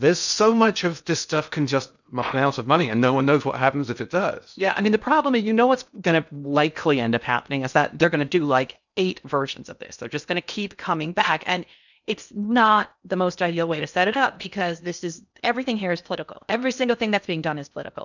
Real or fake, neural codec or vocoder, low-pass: fake; codec, 16 kHz in and 24 kHz out, 0.8 kbps, FocalCodec, streaming, 65536 codes; 7.2 kHz